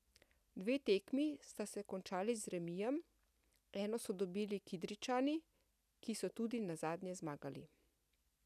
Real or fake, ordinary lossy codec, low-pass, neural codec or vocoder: real; none; 14.4 kHz; none